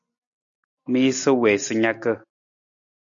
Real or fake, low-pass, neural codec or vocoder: real; 7.2 kHz; none